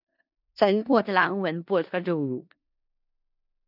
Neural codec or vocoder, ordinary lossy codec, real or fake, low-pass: codec, 16 kHz in and 24 kHz out, 0.4 kbps, LongCat-Audio-Codec, four codebook decoder; none; fake; 5.4 kHz